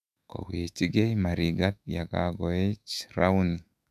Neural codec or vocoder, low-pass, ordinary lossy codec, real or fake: autoencoder, 48 kHz, 128 numbers a frame, DAC-VAE, trained on Japanese speech; 14.4 kHz; none; fake